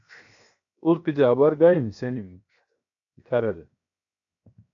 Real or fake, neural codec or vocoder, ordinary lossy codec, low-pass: fake; codec, 16 kHz, 0.7 kbps, FocalCodec; AAC, 64 kbps; 7.2 kHz